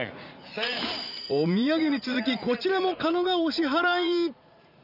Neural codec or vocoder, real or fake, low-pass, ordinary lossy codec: vocoder, 44.1 kHz, 80 mel bands, Vocos; fake; 5.4 kHz; none